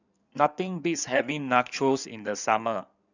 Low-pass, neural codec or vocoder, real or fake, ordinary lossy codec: 7.2 kHz; codec, 16 kHz in and 24 kHz out, 2.2 kbps, FireRedTTS-2 codec; fake; none